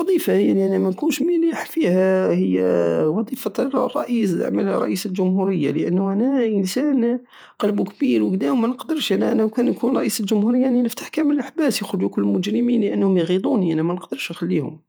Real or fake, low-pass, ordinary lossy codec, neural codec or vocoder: fake; none; none; vocoder, 48 kHz, 128 mel bands, Vocos